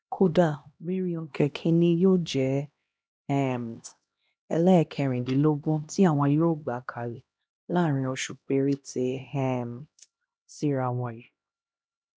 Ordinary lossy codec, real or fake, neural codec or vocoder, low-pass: none; fake; codec, 16 kHz, 1 kbps, X-Codec, HuBERT features, trained on LibriSpeech; none